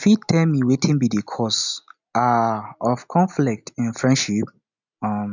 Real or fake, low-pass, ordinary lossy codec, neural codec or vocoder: real; 7.2 kHz; none; none